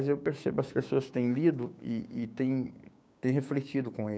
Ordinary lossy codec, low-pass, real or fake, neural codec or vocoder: none; none; fake; codec, 16 kHz, 6 kbps, DAC